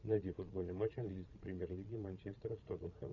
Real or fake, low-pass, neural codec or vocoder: fake; 7.2 kHz; vocoder, 22.05 kHz, 80 mel bands, Vocos